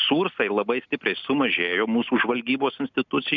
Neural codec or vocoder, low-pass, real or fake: none; 7.2 kHz; real